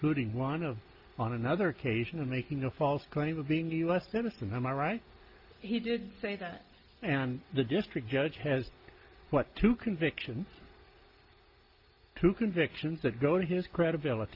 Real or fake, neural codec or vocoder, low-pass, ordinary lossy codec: real; none; 5.4 kHz; Opus, 32 kbps